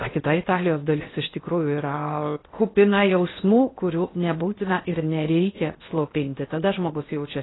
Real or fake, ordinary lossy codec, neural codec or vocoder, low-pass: fake; AAC, 16 kbps; codec, 16 kHz in and 24 kHz out, 0.6 kbps, FocalCodec, streaming, 4096 codes; 7.2 kHz